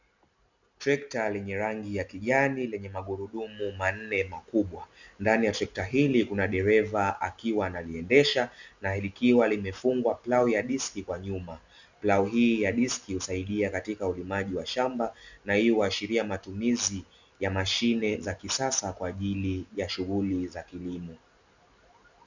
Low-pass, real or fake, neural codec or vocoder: 7.2 kHz; real; none